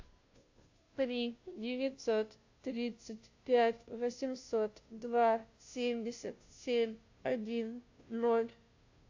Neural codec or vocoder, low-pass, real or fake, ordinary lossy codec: codec, 16 kHz, 0.5 kbps, FunCodec, trained on Chinese and English, 25 frames a second; 7.2 kHz; fake; AAC, 48 kbps